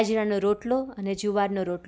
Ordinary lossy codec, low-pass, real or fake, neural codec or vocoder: none; none; real; none